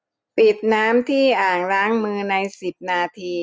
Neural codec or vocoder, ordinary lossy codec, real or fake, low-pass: none; none; real; none